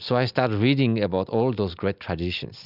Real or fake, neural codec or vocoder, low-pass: real; none; 5.4 kHz